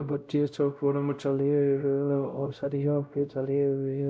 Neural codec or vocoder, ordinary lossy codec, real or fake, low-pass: codec, 16 kHz, 0.5 kbps, X-Codec, WavLM features, trained on Multilingual LibriSpeech; none; fake; none